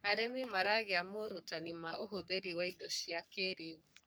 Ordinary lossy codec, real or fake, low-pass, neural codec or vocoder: none; fake; none; codec, 44.1 kHz, 3.4 kbps, Pupu-Codec